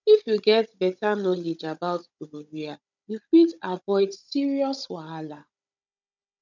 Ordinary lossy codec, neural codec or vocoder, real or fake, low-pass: none; codec, 16 kHz, 16 kbps, FunCodec, trained on Chinese and English, 50 frames a second; fake; 7.2 kHz